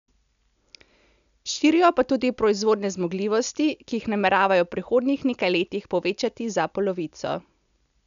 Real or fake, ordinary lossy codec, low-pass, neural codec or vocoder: real; MP3, 96 kbps; 7.2 kHz; none